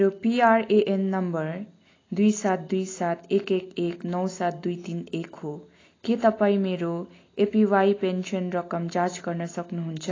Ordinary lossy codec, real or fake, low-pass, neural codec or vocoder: AAC, 32 kbps; real; 7.2 kHz; none